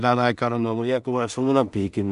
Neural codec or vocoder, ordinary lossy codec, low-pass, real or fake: codec, 16 kHz in and 24 kHz out, 0.4 kbps, LongCat-Audio-Codec, two codebook decoder; none; 10.8 kHz; fake